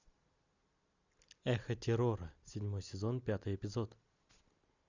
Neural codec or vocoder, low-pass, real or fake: none; 7.2 kHz; real